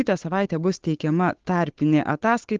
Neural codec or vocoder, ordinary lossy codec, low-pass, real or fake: codec, 16 kHz, 8 kbps, FunCodec, trained on Chinese and English, 25 frames a second; Opus, 16 kbps; 7.2 kHz; fake